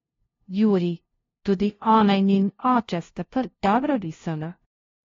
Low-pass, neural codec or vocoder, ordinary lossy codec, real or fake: 7.2 kHz; codec, 16 kHz, 0.5 kbps, FunCodec, trained on LibriTTS, 25 frames a second; AAC, 32 kbps; fake